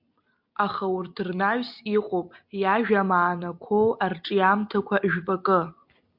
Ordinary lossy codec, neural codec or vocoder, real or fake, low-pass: MP3, 48 kbps; none; real; 5.4 kHz